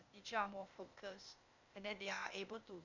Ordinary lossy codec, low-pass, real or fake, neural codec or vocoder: none; 7.2 kHz; fake; codec, 16 kHz, 0.8 kbps, ZipCodec